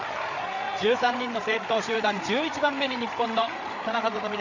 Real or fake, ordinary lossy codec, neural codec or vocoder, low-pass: fake; none; codec, 16 kHz, 8 kbps, FreqCodec, larger model; 7.2 kHz